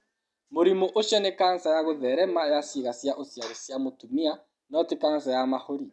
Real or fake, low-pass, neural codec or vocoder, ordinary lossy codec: real; none; none; none